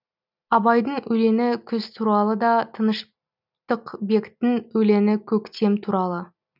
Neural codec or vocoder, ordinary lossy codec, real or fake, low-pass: none; none; real; 5.4 kHz